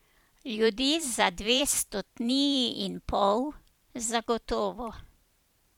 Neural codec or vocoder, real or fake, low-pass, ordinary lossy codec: vocoder, 44.1 kHz, 128 mel bands every 512 samples, BigVGAN v2; fake; 19.8 kHz; MP3, 96 kbps